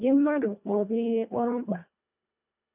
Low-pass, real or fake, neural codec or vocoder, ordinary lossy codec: 3.6 kHz; fake; codec, 24 kHz, 1.5 kbps, HILCodec; none